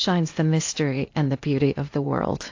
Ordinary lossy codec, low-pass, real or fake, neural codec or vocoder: AAC, 48 kbps; 7.2 kHz; fake; codec, 16 kHz in and 24 kHz out, 0.8 kbps, FocalCodec, streaming, 65536 codes